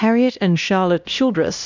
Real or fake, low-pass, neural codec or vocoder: fake; 7.2 kHz; codec, 16 kHz, 1 kbps, X-Codec, HuBERT features, trained on LibriSpeech